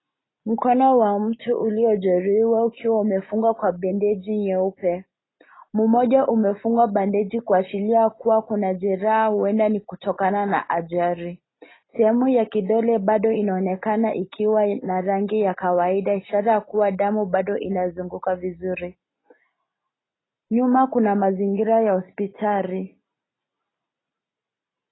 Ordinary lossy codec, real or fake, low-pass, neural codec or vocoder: AAC, 16 kbps; real; 7.2 kHz; none